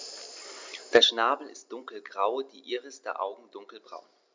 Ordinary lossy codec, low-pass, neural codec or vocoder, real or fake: MP3, 64 kbps; 7.2 kHz; none; real